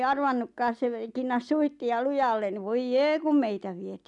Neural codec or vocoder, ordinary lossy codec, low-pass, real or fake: none; none; 10.8 kHz; real